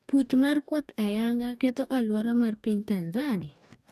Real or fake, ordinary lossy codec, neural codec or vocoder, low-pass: fake; none; codec, 44.1 kHz, 2.6 kbps, DAC; 14.4 kHz